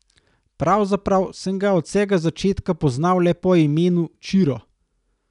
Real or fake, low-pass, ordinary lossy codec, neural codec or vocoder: real; 10.8 kHz; none; none